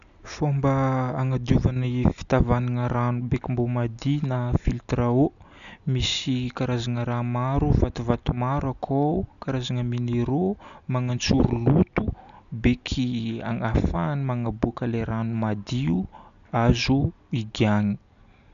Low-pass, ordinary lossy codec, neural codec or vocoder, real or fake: 7.2 kHz; none; none; real